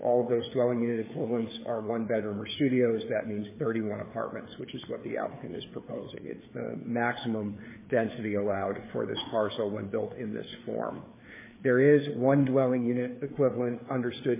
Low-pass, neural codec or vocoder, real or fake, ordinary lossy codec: 3.6 kHz; codec, 16 kHz, 4 kbps, FreqCodec, larger model; fake; MP3, 16 kbps